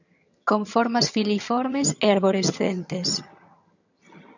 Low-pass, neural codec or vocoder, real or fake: 7.2 kHz; vocoder, 22.05 kHz, 80 mel bands, HiFi-GAN; fake